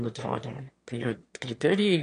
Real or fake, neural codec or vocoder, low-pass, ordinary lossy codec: fake; autoencoder, 22.05 kHz, a latent of 192 numbers a frame, VITS, trained on one speaker; 9.9 kHz; MP3, 64 kbps